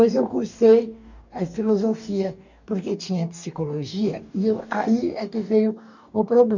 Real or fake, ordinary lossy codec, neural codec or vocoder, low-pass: fake; none; codec, 44.1 kHz, 2.6 kbps, DAC; 7.2 kHz